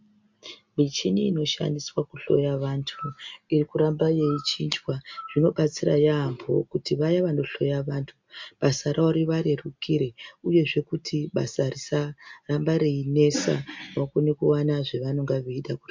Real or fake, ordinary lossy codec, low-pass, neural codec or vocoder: real; MP3, 64 kbps; 7.2 kHz; none